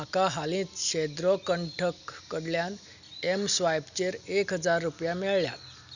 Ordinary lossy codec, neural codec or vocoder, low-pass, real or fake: none; none; 7.2 kHz; real